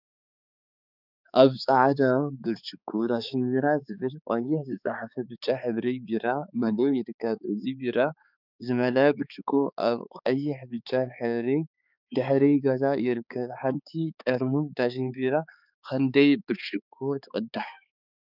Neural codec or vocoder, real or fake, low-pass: codec, 16 kHz, 4 kbps, X-Codec, HuBERT features, trained on balanced general audio; fake; 5.4 kHz